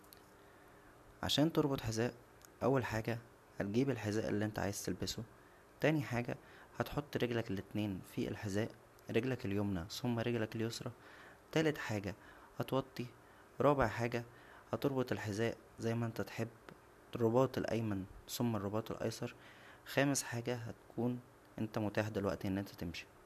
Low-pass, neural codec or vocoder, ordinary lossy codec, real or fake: 14.4 kHz; none; none; real